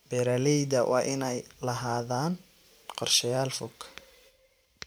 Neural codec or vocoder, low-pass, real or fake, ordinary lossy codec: vocoder, 44.1 kHz, 128 mel bands every 512 samples, BigVGAN v2; none; fake; none